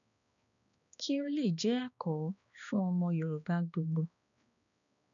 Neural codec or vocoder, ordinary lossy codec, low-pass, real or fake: codec, 16 kHz, 2 kbps, X-Codec, HuBERT features, trained on balanced general audio; MP3, 96 kbps; 7.2 kHz; fake